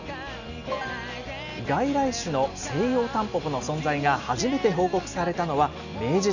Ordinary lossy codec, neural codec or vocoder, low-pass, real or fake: none; none; 7.2 kHz; real